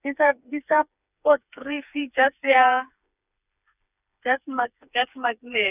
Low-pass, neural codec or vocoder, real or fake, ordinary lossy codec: 3.6 kHz; codec, 16 kHz, 4 kbps, FreqCodec, smaller model; fake; none